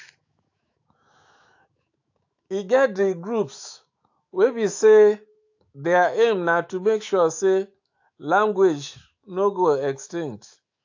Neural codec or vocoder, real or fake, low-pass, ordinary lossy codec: autoencoder, 48 kHz, 128 numbers a frame, DAC-VAE, trained on Japanese speech; fake; 7.2 kHz; AAC, 48 kbps